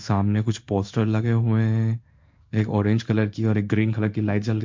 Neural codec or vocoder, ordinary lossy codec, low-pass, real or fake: codec, 16 kHz in and 24 kHz out, 1 kbps, XY-Tokenizer; MP3, 64 kbps; 7.2 kHz; fake